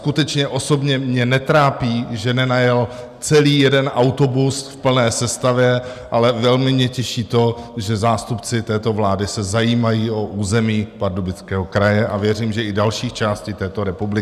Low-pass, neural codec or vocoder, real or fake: 14.4 kHz; none; real